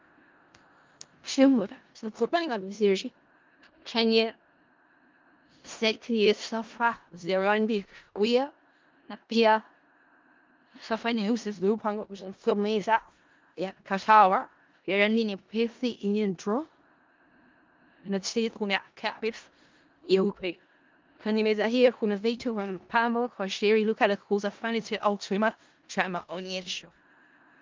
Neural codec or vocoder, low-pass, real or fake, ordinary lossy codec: codec, 16 kHz in and 24 kHz out, 0.4 kbps, LongCat-Audio-Codec, four codebook decoder; 7.2 kHz; fake; Opus, 24 kbps